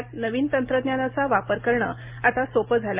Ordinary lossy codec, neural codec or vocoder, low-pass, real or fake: Opus, 32 kbps; none; 3.6 kHz; real